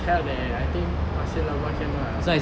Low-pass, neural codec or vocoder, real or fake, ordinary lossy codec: none; none; real; none